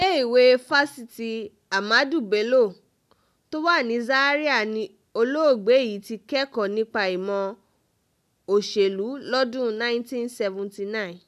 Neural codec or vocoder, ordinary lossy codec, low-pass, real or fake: none; none; 14.4 kHz; real